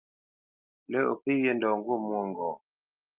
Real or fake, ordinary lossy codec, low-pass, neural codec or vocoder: real; Opus, 32 kbps; 3.6 kHz; none